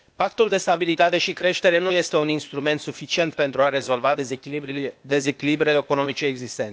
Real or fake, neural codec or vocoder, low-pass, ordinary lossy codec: fake; codec, 16 kHz, 0.8 kbps, ZipCodec; none; none